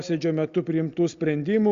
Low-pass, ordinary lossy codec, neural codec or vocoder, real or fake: 7.2 kHz; Opus, 64 kbps; none; real